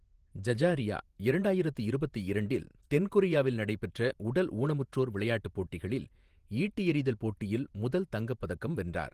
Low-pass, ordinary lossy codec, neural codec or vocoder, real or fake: 14.4 kHz; Opus, 24 kbps; vocoder, 48 kHz, 128 mel bands, Vocos; fake